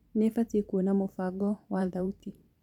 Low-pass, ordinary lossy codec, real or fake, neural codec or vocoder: 19.8 kHz; none; fake; vocoder, 44.1 kHz, 128 mel bands every 512 samples, BigVGAN v2